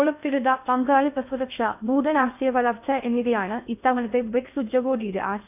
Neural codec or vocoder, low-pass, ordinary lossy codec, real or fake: codec, 16 kHz in and 24 kHz out, 0.6 kbps, FocalCodec, streaming, 2048 codes; 3.6 kHz; none; fake